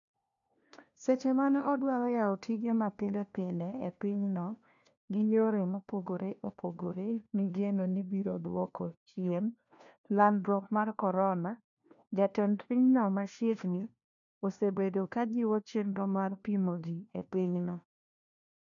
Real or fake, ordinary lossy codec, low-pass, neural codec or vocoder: fake; none; 7.2 kHz; codec, 16 kHz, 1 kbps, FunCodec, trained on LibriTTS, 50 frames a second